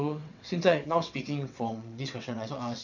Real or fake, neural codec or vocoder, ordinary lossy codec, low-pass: fake; vocoder, 22.05 kHz, 80 mel bands, WaveNeXt; none; 7.2 kHz